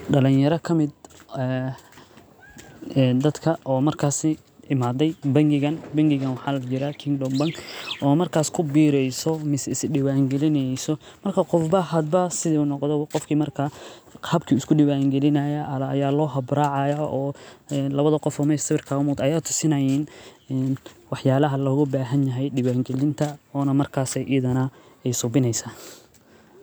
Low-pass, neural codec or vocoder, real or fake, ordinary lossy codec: none; none; real; none